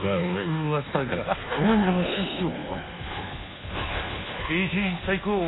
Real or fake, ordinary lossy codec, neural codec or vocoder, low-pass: fake; AAC, 16 kbps; codec, 24 kHz, 1.2 kbps, DualCodec; 7.2 kHz